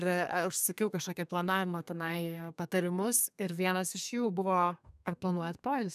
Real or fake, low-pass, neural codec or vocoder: fake; 14.4 kHz; codec, 32 kHz, 1.9 kbps, SNAC